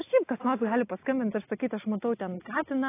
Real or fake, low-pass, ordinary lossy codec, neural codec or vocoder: real; 3.6 kHz; AAC, 16 kbps; none